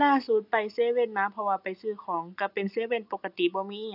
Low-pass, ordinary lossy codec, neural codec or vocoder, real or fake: 5.4 kHz; none; none; real